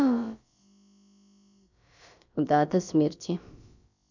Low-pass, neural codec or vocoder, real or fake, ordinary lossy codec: 7.2 kHz; codec, 16 kHz, about 1 kbps, DyCAST, with the encoder's durations; fake; none